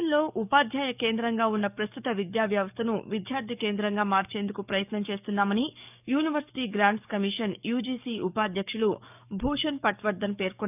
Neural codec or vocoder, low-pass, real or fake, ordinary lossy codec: codec, 44.1 kHz, 7.8 kbps, DAC; 3.6 kHz; fake; none